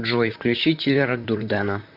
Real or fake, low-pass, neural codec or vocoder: fake; 5.4 kHz; vocoder, 44.1 kHz, 128 mel bands, Pupu-Vocoder